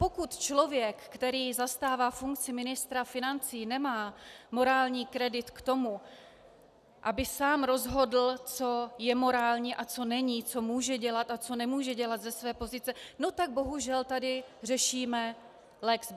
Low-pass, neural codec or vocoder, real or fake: 14.4 kHz; none; real